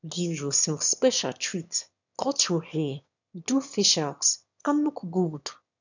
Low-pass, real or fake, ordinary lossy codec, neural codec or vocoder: 7.2 kHz; fake; none; autoencoder, 22.05 kHz, a latent of 192 numbers a frame, VITS, trained on one speaker